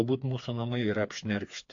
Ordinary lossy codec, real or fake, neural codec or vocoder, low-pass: AAC, 48 kbps; fake; codec, 16 kHz, 4 kbps, FreqCodec, smaller model; 7.2 kHz